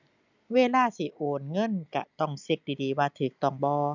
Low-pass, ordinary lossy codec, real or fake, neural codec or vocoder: 7.2 kHz; none; real; none